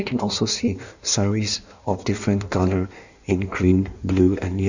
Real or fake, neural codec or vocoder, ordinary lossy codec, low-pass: fake; codec, 16 kHz in and 24 kHz out, 1.1 kbps, FireRedTTS-2 codec; none; 7.2 kHz